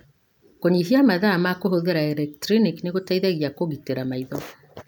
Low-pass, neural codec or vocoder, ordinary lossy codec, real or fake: none; none; none; real